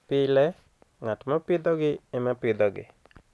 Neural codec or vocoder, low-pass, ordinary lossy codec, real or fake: none; none; none; real